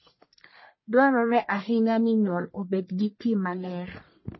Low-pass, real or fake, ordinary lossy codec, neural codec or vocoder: 7.2 kHz; fake; MP3, 24 kbps; codec, 44.1 kHz, 1.7 kbps, Pupu-Codec